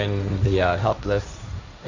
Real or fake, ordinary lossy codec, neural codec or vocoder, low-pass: fake; Opus, 64 kbps; codec, 16 kHz, 1.1 kbps, Voila-Tokenizer; 7.2 kHz